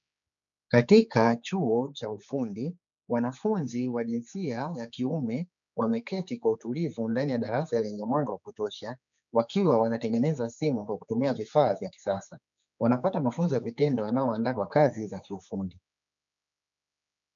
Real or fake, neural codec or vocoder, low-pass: fake; codec, 16 kHz, 4 kbps, X-Codec, HuBERT features, trained on general audio; 7.2 kHz